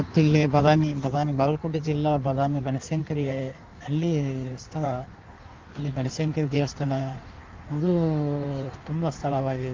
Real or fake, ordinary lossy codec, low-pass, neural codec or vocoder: fake; Opus, 32 kbps; 7.2 kHz; codec, 16 kHz in and 24 kHz out, 1.1 kbps, FireRedTTS-2 codec